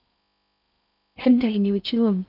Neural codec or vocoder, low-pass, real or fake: codec, 16 kHz in and 24 kHz out, 0.6 kbps, FocalCodec, streaming, 4096 codes; 5.4 kHz; fake